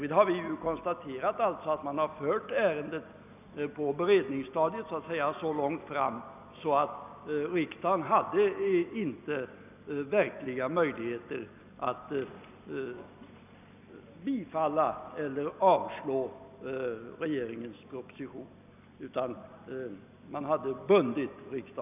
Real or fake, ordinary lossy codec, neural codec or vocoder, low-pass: real; none; none; 3.6 kHz